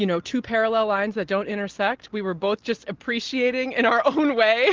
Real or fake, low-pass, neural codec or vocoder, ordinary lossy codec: real; 7.2 kHz; none; Opus, 16 kbps